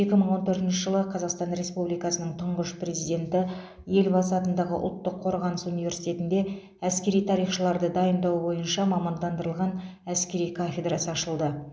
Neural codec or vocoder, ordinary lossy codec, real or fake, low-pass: none; none; real; none